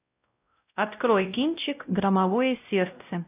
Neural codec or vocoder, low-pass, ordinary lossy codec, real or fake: codec, 16 kHz, 0.5 kbps, X-Codec, WavLM features, trained on Multilingual LibriSpeech; 3.6 kHz; AAC, 32 kbps; fake